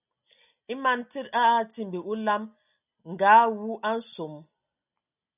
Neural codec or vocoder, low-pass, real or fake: none; 3.6 kHz; real